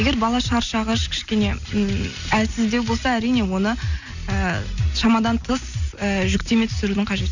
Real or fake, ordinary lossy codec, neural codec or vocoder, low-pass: real; none; none; 7.2 kHz